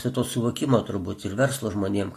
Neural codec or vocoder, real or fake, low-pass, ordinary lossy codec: none; real; 14.4 kHz; AAC, 48 kbps